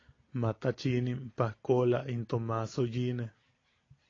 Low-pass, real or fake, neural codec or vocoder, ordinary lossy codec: 7.2 kHz; real; none; AAC, 32 kbps